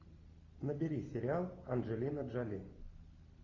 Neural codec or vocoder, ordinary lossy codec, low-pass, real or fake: none; AAC, 32 kbps; 7.2 kHz; real